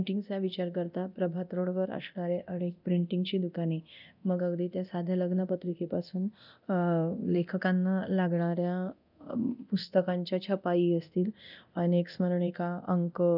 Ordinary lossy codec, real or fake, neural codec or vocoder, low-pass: none; fake; codec, 24 kHz, 0.9 kbps, DualCodec; 5.4 kHz